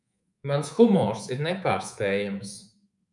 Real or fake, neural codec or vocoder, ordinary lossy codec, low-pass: fake; codec, 24 kHz, 3.1 kbps, DualCodec; MP3, 96 kbps; 10.8 kHz